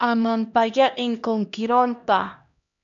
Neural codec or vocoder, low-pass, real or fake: codec, 16 kHz, 1 kbps, X-Codec, HuBERT features, trained on LibriSpeech; 7.2 kHz; fake